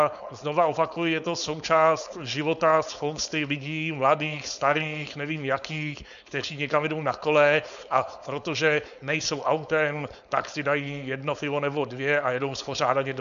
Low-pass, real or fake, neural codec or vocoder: 7.2 kHz; fake; codec, 16 kHz, 4.8 kbps, FACodec